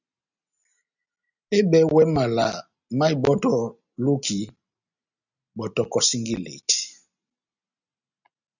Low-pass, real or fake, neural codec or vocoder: 7.2 kHz; real; none